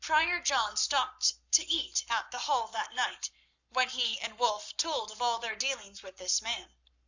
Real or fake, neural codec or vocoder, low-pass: fake; codec, 44.1 kHz, 7.8 kbps, Pupu-Codec; 7.2 kHz